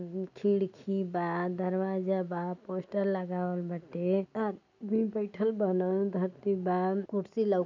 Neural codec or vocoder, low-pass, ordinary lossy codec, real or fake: none; 7.2 kHz; none; real